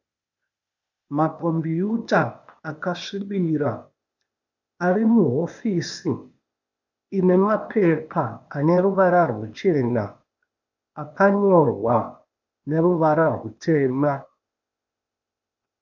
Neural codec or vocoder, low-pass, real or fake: codec, 16 kHz, 0.8 kbps, ZipCodec; 7.2 kHz; fake